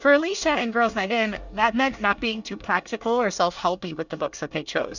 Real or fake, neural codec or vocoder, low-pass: fake; codec, 24 kHz, 1 kbps, SNAC; 7.2 kHz